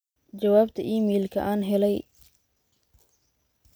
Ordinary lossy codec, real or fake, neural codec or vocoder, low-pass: none; real; none; none